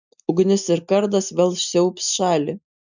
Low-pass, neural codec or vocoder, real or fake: 7.2 kHz; none; real